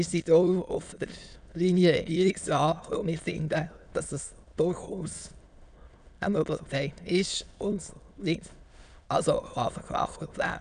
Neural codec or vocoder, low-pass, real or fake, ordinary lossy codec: autoencoder, 22.05 kHz, a latent of 192 numbers a frame, VITS, trained on many speakers; 9.9 kHz; fake; none